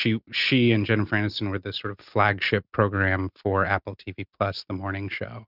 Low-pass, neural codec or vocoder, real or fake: 5.4 kHz; none; real